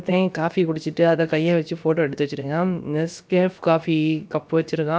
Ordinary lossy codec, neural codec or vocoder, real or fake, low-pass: none; codec, 16 kHz, about 1 kbps, DyCAST, with the encoder's durations; fake; none